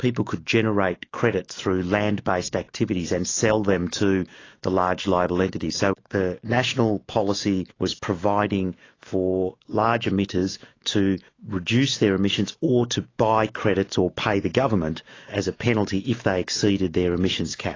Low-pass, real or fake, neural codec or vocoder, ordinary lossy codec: 7.2 kHz; fake; autoencoder, 48 kHz, 128 numbers a frame, DAC-VAE, trained on Japanese speech; AAC, 32 kbps